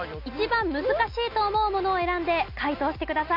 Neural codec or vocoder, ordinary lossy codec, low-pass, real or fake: none; none; 5.4 kHz; real